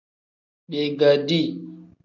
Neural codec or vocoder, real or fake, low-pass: none; real; 7.2 kHz